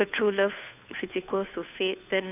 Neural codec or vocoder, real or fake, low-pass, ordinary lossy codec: codec, 16 kHz, 2 kbps, FunCodec, trained on Chinese and English, 25 frames a second; fake; 3.6 kHz; none